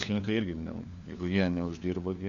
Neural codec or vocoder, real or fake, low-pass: codec, 16 kHz, 4 kbps, FunCodec, trained on LibriTTS, 50 frames a second; fake; 7.2 kHz